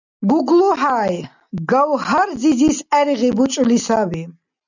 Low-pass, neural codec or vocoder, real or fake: 7.2 kHz; none; real